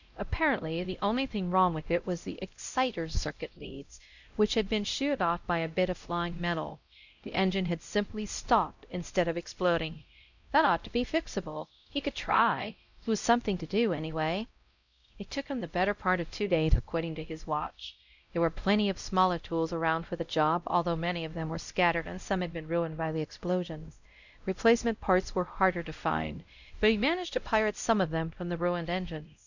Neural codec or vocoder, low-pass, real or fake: codec, 16 kHz, 0.5 kbps, X-Codec, WavLM features, trained on Multilingual LibriSpeech; 7.2 kHz; fake